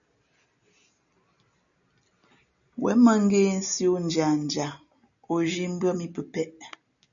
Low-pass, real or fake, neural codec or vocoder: 7.2 kHz; real; none